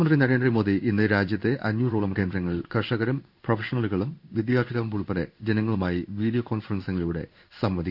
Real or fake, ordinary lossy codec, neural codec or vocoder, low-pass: fake; none; codec, 16 kHz in and 24 kHz out, 1 kbps, XY-Tokenizer; 5.4 kHz